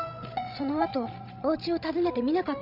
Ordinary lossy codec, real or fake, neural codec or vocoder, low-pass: none; fake; codec, 16 kHz, 16 kbps, FreqCodec, larger model; 5.4 kHz